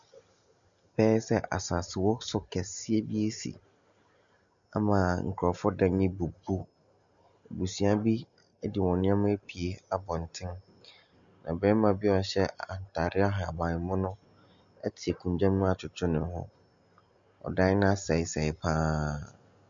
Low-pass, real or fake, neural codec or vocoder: 7.2 kHz; real; none